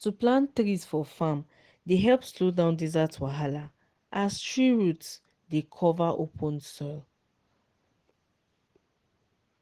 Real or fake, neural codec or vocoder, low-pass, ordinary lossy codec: real; none; 14.4 kHz; Opus, 16 kbps